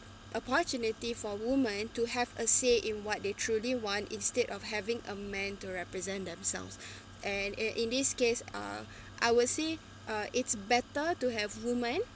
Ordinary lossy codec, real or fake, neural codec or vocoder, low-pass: none; real; none; none